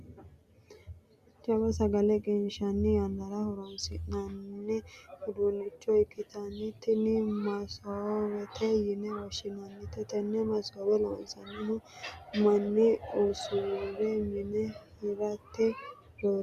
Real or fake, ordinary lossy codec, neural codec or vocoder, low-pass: real; Opus, 64 kbps; none; 14.4 kHz